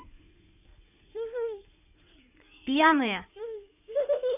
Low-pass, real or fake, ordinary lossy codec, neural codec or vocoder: 3.6 kHz; fake; none; codec, 16 kHz in and 24 kHz out, 2.2 kbps, FireRedTTS-2 codec